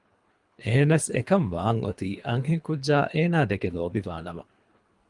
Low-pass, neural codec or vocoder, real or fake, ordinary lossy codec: 10.8 kHz; codec, 24 kHz, 3 kbps, HILCodec; fake; Opus, 32 kbps